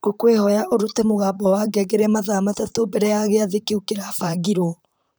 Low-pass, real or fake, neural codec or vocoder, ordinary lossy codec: none; fake; vocoder, 44.1 kHz, 128 mel bands, Pupu-Vocoder; none